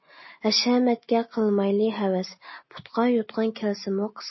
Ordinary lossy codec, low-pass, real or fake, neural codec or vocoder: MP3, 24 kbps; 7.2 kHz; real; none